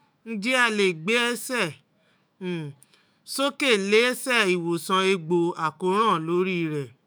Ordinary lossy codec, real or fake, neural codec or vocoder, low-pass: none; fake; autoencoder, 48 kHz, 128 numbers a frame, DAC-VAE, trained on Japanese speech; none